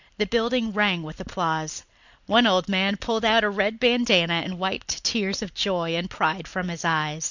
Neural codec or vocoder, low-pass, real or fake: none; 7.2 kHz; real